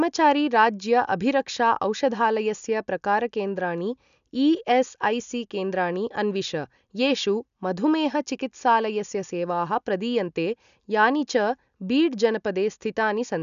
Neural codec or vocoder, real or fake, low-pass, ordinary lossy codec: none; real; 7.2 kHz; none